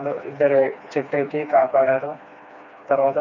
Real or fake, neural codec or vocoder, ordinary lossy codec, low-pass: fake; codec, 16 kHz, 2 kbps, FreqCodec, smaller model; none; 7.2 kHz